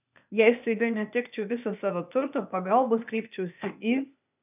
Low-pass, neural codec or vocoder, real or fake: 3.6 kHz; codec, 16 kHz, 0.8 kbps, ZipCodec; fake